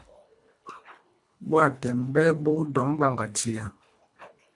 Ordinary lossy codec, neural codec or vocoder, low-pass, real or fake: AAC, 64 kbps; codec, 24 kHz, 1.5 kbps, HILCodec; 10.8 kHz; fake